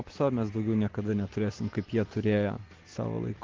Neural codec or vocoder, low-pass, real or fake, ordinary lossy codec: none; 7.2 kHz; real; Opus, 16 kbps